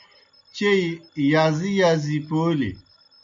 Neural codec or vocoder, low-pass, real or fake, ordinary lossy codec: none; 7.2 kHz; real; MP3, 48 kbps